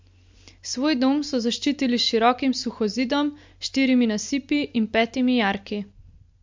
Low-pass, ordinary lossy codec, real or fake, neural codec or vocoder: 7.2 kHz; MP3, 48 kbps; real; none